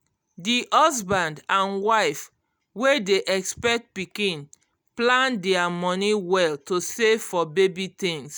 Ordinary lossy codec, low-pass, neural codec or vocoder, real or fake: none; none; none; real